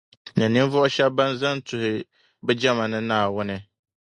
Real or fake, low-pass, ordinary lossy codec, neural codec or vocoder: real; 10.8 kHz; Opus, 64 kbps; none